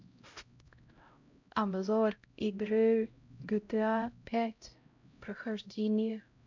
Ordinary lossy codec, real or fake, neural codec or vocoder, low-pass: MP3, 64 kbps; fake; codec, 16 kHz, 0.5 kbps, X-Codec, HuBERT features, trained on LibriSpeech; 7.2 kHz